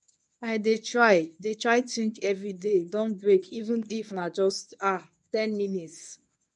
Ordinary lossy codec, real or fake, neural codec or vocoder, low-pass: none; fake; codec, 24 kHz, 0.9 kbps, WavTokenizer, medium speech release version 1; 10.8 kHz